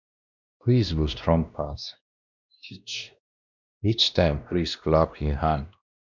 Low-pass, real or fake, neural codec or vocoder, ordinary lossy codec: 7.2 kHz; fake; codec, 16 kHz, 1 kbps, X-Codec, WavLM features, trained on Multilingual LibriSpeech; none